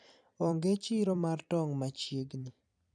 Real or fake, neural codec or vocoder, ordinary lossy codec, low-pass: fake; vocoder, 44.1 kHz, 128 mel bands every 256 samples, BigVGAN v2; none; 9.9 kHz